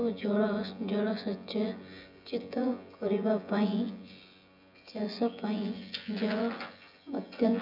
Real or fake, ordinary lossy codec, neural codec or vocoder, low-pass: fake; none; vocoder, 24 kHz, 100 mel bands, Vocos; 5.4 kHz